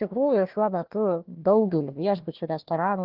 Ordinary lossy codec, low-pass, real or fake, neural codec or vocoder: Opus, 16 kbps; 5.4 kHz; fake; codec, 16 kHz, 1 kbps, FunCodec, trained on Chinese and English, 50 frames a second